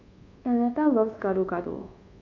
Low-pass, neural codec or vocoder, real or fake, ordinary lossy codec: 7.2 kHz; codec, 24 kHz, 1.2 kbps, DualCodec; fake; AAC, 48 kbps